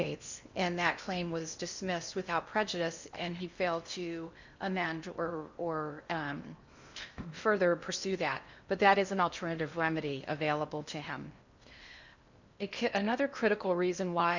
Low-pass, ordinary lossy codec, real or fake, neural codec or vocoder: 7.2 kHz; Opus, 64 kbps; fake; codec, 16 kHz in and 24 kHz out, 0.8 kbps, FocalCodec, streaming, 65536 codes